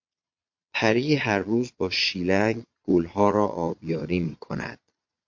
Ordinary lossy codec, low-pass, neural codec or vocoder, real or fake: MP3, 48 kbps; 7.2 kHz; vocoder, 22.05 kHz, 80 mel bands, Vocos; fake